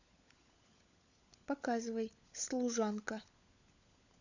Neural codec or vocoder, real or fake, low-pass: codec, 16 kHz, 16 kbps, FunCodec, trained on LibriTTS, 50 frames a second; fake; 7.2 kHz